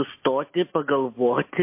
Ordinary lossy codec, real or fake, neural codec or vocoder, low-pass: MP3, 24 kbps; real; none; 3.6 kHz